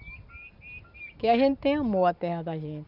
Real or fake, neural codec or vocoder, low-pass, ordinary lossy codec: real; none; 5.4 kHz; none